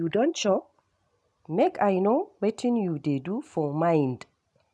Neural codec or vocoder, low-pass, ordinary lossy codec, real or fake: none; none; none; real